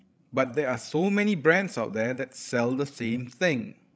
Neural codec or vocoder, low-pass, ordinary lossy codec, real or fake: codec, 16 kHz, 16 kbps, FreqCodec, larger model; none; none; fake